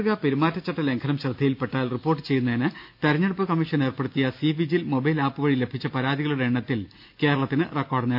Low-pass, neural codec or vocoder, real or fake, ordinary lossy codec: 5.4 kHz; none; real; none